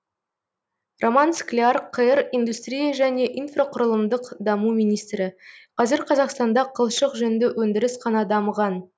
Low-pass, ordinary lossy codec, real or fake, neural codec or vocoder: none; none; real; none